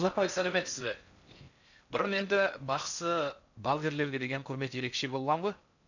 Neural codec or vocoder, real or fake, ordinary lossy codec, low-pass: codec, 16 kHz in and 24 kHz out, 0.6 kbps, FocalCodec, streaming, 4096 codes; fake; none; 7.2 kHz